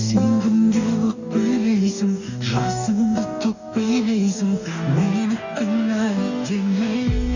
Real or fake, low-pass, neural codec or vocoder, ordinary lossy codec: fake; 7.2 kHz; codec, 44.1 kHz, 2.6 kbps, DAC; none